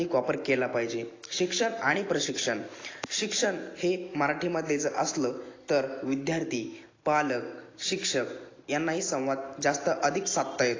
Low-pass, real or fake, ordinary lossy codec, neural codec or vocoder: 7.2 kHz; real; AAC, 32 kbps; none